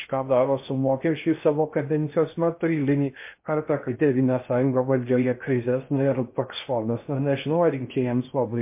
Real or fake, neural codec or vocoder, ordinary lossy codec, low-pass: fake; codec, 16 kHz in and 24 kHz out, 0.6 kbps, FocalCodec, streaming, 2048 codes; MP3, 24 kbps; 3.6 kHz